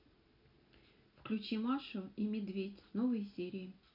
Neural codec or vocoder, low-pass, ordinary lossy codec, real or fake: none; 5.4 kHz; none; real